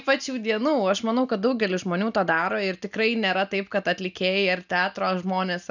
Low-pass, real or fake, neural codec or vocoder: 7.2 kHz; real; none